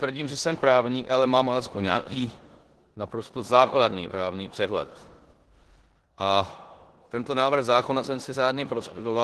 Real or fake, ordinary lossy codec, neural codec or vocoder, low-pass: fake; Opus, 16 kbps; codec, 16 kHz in and 24 kHz out, 0.9 kbps, LongCat-Audio-Codec, four codebook decoder; 10.8 kHz